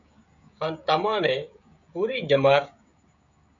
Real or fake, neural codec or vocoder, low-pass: fake; codec, 16 kHz, 16 kbps, FreqCodec, smaller model; 7.2 kHz